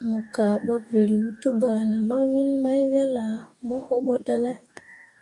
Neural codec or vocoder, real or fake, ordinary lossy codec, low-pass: codec, 44.1 kHz, 2.6 kbps, DAC; fake; MP3, 64 kbps; 10.8 kHz